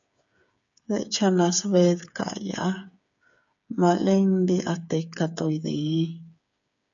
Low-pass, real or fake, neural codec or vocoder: 7.2 kHz; fake; codec, 16 kHz, 8 kbps, FreqCodec, smaller model